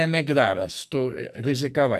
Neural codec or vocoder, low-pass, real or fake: codec, 44.1 kHz, 2.6 kbps, SNAC; 14.4 kHz; fake